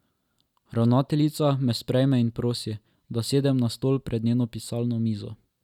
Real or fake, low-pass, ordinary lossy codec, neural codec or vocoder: real; 19.8 kHz; none; none